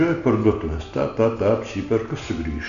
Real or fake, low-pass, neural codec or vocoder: real; 7.2 kHz; none